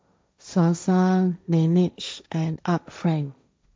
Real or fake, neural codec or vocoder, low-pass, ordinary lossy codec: fake; codec, 16 kHz, 1.1 kbps, Voila-Tokenizer; none; none